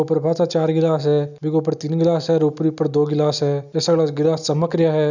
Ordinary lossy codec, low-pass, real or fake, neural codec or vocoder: none; 7.2 kHz; real; none